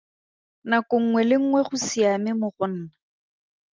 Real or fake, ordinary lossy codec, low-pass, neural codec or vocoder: real; Opus, 32 kbps; 7.2 kHz; none